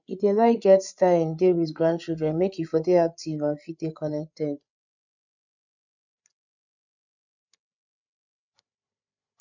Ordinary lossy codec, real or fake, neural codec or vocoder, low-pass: none; fake; codec, 16 kHz, 8 kbps, FreqCodec, larger model; 7.2 kHz